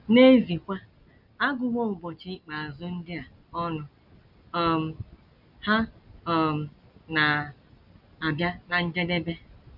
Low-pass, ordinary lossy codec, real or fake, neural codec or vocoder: 5.4 kHz; none; real; none